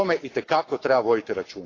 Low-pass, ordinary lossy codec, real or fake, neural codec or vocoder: 7.2 kHz; AAC, 32 kbps; fake; codec, 44.1 kHz, 7.8 kbps, Pupu-Codec